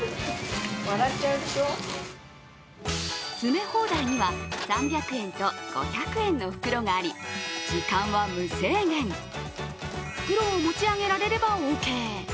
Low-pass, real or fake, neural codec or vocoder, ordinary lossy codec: none; real; none; none